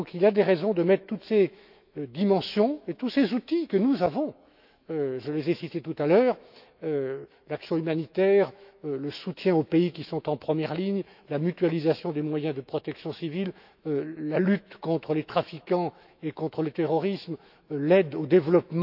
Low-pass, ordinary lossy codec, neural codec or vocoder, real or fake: 5.4 kHz; none; autoencoder, 48 kHz, 128 numbers a frame, DAC-VAE, trained on Japanese speech; fake